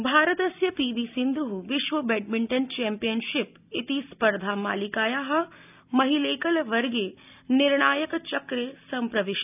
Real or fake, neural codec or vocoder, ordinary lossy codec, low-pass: real; none; none; 3.6 kHz